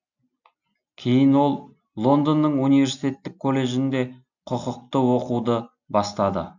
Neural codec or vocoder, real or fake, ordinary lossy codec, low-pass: none; real; none; 7.2 kHz